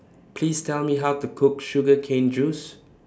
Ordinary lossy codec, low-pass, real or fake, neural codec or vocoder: none; none; real; none